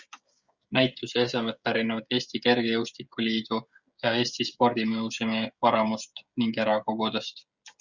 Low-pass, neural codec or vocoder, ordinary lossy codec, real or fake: 7.2 kHz; codec, 16 kHz, 16 kbps, FreqCodec, smaller model; Opus, 64 kbps; fake